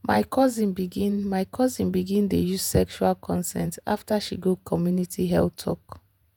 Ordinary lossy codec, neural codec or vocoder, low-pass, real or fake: none; vocoder, 48 kHz, 128 mel bands, Vocos; none; fake